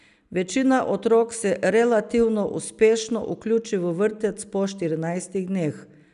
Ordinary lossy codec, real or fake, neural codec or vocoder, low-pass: none; real; none; 10.8 kHz